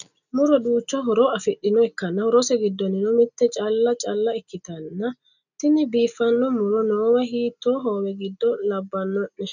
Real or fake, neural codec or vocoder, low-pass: real; none; 7.2 kHz